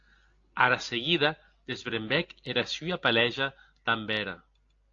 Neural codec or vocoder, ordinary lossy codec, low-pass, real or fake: none; AAC, 48 kbps; 7.2 kHz; real